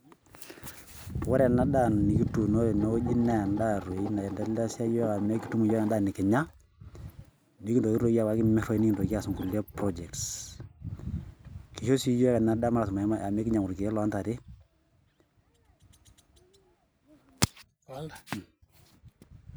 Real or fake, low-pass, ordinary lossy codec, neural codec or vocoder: real; none; none; none